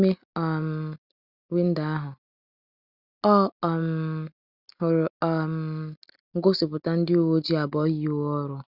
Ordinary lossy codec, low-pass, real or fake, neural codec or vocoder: Opus, 64 kbps; 5.4 kHz; real; none